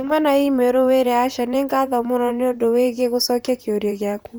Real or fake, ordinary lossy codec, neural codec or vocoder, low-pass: fake; none; vocoder, 44.1 kHz, 128 mel bands, Pupu-Vocoder; none